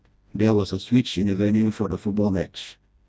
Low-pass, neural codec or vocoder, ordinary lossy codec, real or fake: none; codec, 16 kHz, 1 kbps, FreqCodec, smaller model; none; fake